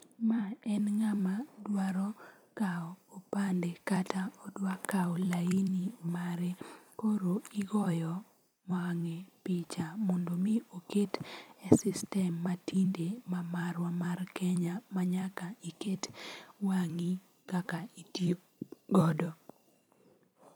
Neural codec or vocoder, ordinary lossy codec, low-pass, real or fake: vocoder, 44.1 kHz, 128 mel bands every 256 samples, BigVGAN v2; none; none; fake